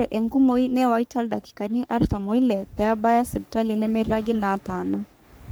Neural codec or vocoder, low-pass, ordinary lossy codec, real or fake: codec, 44.1 kHz, 3.4 kbps, Pupu-Codec; none; none; fake